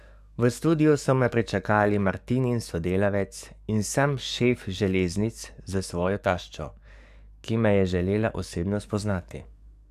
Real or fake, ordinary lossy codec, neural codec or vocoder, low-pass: fake; none; codec, 44.1 kHz, 7.8 kbps, DAC; 14.4 kHz